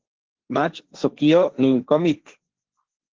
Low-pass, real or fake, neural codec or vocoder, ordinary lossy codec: 7.2 kHz; fake; codec, 16 kHz, 1.1 kbps, Voila-Tokenizer; Opus, 16 kbps